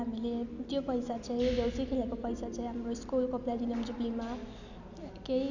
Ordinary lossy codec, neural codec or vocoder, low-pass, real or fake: none; none; 7.2 kHz; real